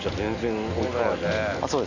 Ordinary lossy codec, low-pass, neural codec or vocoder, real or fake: none; 7.2 kHz; none; real